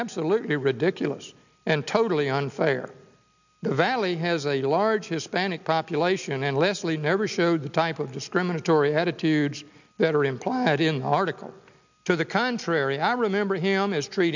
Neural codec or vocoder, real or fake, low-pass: none; real; 7.2 kHz